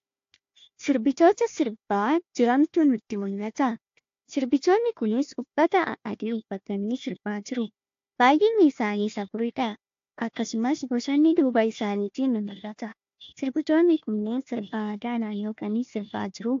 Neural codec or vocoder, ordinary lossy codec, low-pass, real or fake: codec, 16 kHz, 1 kbps, FunCodec, trained on Chinese and English, 50 frames a second; AAC, 48 kbps; 7.2 kHz; fake